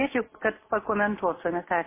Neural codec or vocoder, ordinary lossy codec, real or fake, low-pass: none; MP3, 16 kbps; real; 3.6 kHz